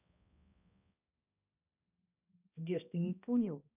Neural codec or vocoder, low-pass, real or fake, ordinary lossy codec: codec, 16 kHz, 1 kbps, X-Codec, HuBERT features, trained on balanced general audio; 3.6 kHz; fake; none